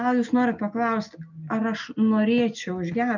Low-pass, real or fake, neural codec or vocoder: 7.2 kHz; real; none